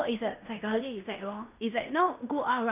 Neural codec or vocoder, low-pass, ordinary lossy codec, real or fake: codec, 16 kHz in and 24 kHz out, 0.9 kbps, LongCat-Audio-Codec, fine tuned four codebook decoder; 3.6 kHz; none; fake